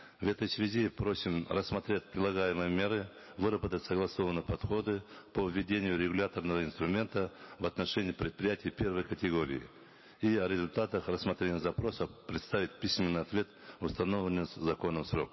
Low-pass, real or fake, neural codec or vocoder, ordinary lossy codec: 7.2 kHz; real; none; MP3, 24 kbps